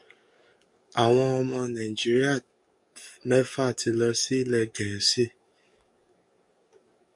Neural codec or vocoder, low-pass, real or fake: codec, 44.1 kHz, 7.8 kbps, DAC; 10.8 kHz; fake